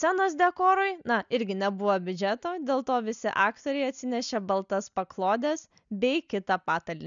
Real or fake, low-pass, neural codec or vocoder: real; 7.2 kHz; none